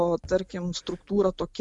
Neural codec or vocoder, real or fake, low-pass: none; real; 10.8 kHz